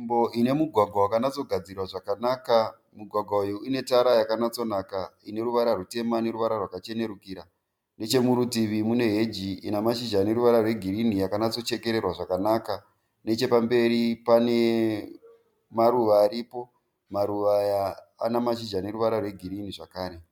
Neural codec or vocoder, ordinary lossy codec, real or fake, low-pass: none; MP3, 96 kbps; real; 19.8 kHz